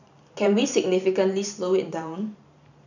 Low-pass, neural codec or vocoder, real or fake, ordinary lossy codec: 7.2 kHz; vocoder, 44.1 kHz, 128 mel bands every 512 samples, BigVGAN v2; fake; none